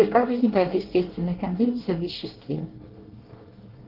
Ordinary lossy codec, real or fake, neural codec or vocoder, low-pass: Opus, 16 kbps; fake; codec, 24 kHz, 1 kbps, SNAC; 5.4 kHz